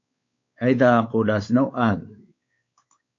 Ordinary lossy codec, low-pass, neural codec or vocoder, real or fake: AAC, 48 kbps; 7.2 kHz; codec, 16 kHz, 4 kbps, X-Codec, WavLM features, trained on Multilingual LibriSpeech; fake